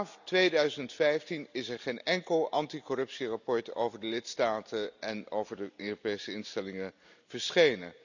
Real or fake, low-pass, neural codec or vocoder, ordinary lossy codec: real; 7.2 kHz; none; none